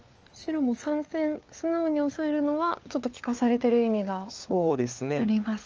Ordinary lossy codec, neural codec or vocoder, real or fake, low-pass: Opus, 24 kbps; codec, 16 kHz, 4 kbps, FunCodec, trained on LibriTTS, 50 frames a second; fake; 7.2 kHz